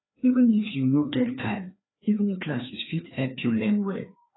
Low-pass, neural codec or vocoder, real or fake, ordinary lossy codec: 7.2 kHz; codec, 16 kHz, 2 kbps, FreqCodec, larger model; fake; AAC, 16 kbps